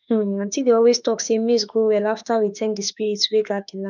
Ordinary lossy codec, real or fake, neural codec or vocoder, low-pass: none; fake; autoencoder, 48 kHz, 32 numbers a frame, DAC-VAE, trained on Japanese speech; 7.2 kHz